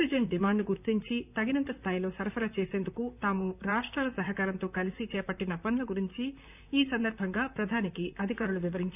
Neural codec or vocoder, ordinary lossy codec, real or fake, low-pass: vocoder, 44.1 kHz, 128 mel bands, Pupu-Vocoder; none; fake; 3.6 kHz